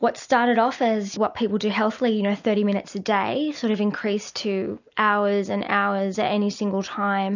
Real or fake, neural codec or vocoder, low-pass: real; none; 7.2 kHz